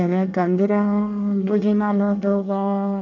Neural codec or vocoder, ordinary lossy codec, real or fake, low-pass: codec, 24 kHz, 1 kbps, SNAC; none; fake; 7.2 kHz